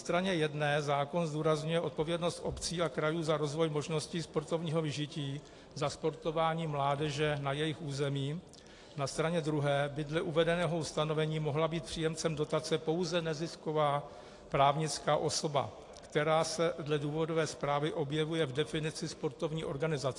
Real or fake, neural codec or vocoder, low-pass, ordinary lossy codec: real; none; 10.8 kHz; AAC, 48 kbps